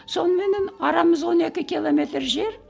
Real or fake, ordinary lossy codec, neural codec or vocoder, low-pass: real; none; none; none